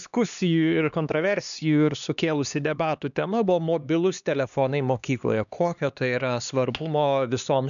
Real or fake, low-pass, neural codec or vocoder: fake; 7.2 kHz; codec, 16 kHz, 2 kbps, X-Codec, HuBERT features, trained on LibriSpeech